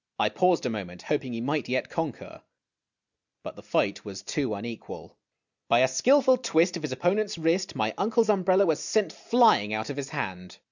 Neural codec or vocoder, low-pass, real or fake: none; 7.2 kHz; real